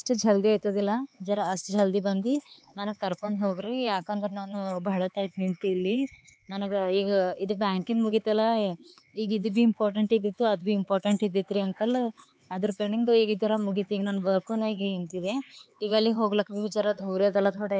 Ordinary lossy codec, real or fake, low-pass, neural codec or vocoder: none; fake; none; codec, 16 kHz, 4 kbps, X-Codec, HuBERT features, trained on LibriSpeech